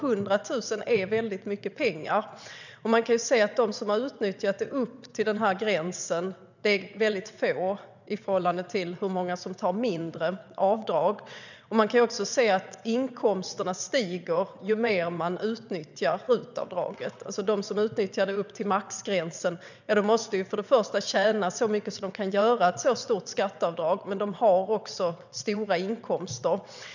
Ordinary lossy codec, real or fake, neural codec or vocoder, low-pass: none; fake; vocoder, 22.05 kHz, 80 mel bands, WaveNeXt; 7.2 kHz